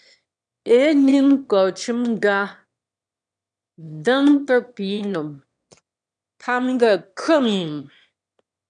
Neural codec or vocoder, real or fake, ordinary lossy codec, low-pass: autoencoder, 22.05 kHz, a latent of 192 numbers a frame, VITS, trained on one speaker; fake; MP3, 96 kbps; 9.9 kHz